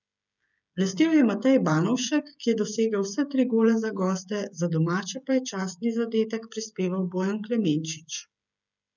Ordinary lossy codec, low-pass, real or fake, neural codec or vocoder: none; 7.2 kHz; fake; codec, 16 kHz, 16 kbps, FreqCodec, smaller model